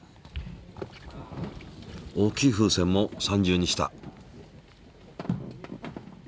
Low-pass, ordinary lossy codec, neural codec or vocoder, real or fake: none; none; none; real